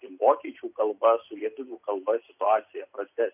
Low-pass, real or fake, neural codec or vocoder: 3.6 kHz; real; none